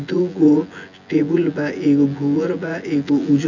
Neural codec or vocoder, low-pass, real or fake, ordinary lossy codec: vocoder, 24 kHz, 100 mel bands, Vocos; 7.2 kHz; fake; none